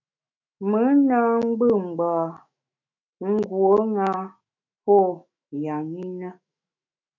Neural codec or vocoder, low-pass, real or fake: autoencoder, 48 kHz, 128 numbers a frame, DAC-VAE, trained on Japanese speech; 7.2 kHz; fake